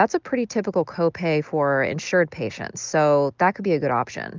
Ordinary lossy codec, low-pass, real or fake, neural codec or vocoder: Opus, 32 kbps; 7.2 kHz; real; none